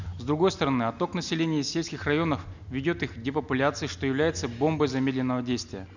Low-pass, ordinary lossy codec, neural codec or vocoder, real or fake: 7.2 kHz; none; none; real